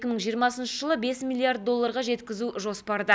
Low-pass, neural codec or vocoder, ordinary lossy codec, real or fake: none; none; none; real